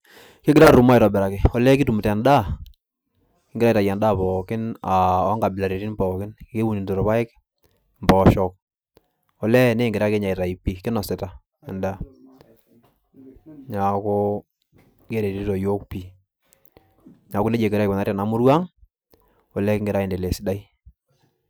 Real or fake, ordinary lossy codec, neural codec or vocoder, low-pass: real; none; none; none